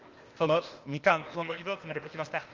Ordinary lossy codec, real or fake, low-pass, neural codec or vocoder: Opus, 32 kbps; fake; 7.2 kHz; codec, 16 kHz, 0.8 kbps, ZipCodec